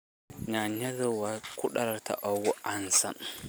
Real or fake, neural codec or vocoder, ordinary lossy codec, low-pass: real; none; none; none